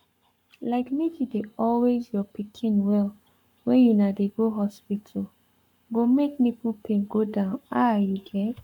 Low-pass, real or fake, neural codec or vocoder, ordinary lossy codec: 19.8 kHz; fake; codec, 44.1 kHz, 7.8 kbps, Pupu-Codec; none